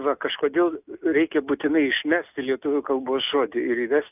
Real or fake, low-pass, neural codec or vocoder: real; 3.6 kHz; none